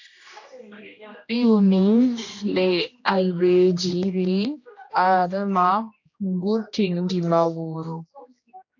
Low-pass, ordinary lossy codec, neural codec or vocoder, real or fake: 7.2 kHz; AAC, 48 kbps; codec, 16 kHz, 1 kbps, X-Codec, HuBERT features, trained on general audio; fake